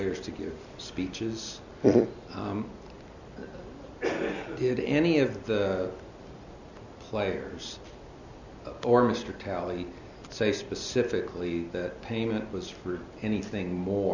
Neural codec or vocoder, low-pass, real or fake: none; 7.2 kHz; real